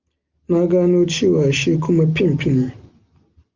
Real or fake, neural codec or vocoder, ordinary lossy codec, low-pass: real; none; Opus, 24 kbps; 7.2 kHz